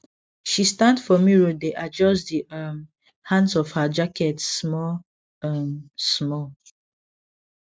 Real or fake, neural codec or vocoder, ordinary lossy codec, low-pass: real; none; none; none